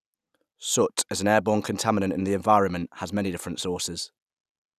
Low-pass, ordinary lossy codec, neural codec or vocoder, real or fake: 14.4 kHz; none; none; real